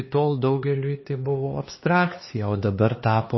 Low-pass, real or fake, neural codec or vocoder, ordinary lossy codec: 7.2 kHz; fake; codec, 16 kHz, 2 kbps, X-Codec, HuBERT features, trained on LibriSpeech; MP3, 24 kbps